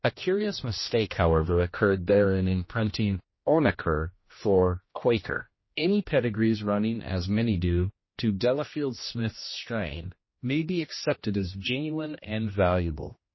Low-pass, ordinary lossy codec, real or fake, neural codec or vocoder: 7.2 kHz; MP3, 24 kbps; fake; codec, 16 kHz, 1 kbps, X-Codec, HuBERT features, trained on general audio